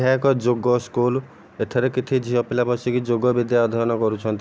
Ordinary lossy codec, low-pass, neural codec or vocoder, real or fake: none; none; none; real